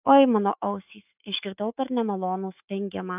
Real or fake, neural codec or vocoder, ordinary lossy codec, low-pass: fake; autoencoder, 48 kHz, 128 numbers a frame, DAC-VAE, trained on Japanese speech; AAC, 32 kbps; 3.6 kHz